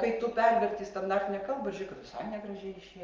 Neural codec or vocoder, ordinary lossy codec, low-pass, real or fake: none; Opus, 24 kbps; 7.2 kHz; real